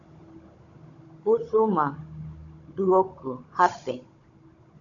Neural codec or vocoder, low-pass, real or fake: codec, 16 kHz, 16 kbps, FunCodec, trained on Chinese and English, 50 frames a second; 7.2 kHz; fake